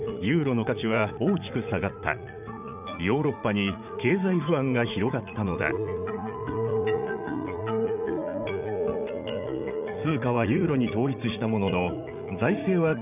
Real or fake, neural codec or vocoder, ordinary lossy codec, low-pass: fake; vocoder, 44.1 kHz, 80 mel bands, Vocos; none; 3.6 kHz